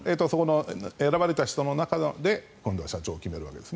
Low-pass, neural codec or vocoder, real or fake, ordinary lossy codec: none; none; real; none